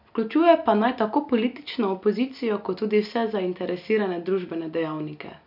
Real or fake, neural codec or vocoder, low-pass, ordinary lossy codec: real; none; 5.4 kHz; none